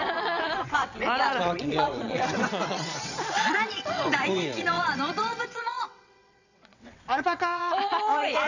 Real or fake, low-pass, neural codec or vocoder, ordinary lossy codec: fake; 7.2 kHz; vocoder, 22.05 kHz, 80 mel bands, WaveNeXt; none